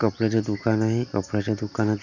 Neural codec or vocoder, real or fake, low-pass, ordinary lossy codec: none; real; 7.2 kHz; none